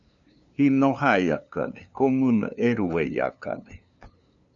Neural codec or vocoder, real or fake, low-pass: codec, 16 kHz, 2 kbps, FunCodec, trained on LibriTTS, 25 frames a second; fake; 7.2 kHz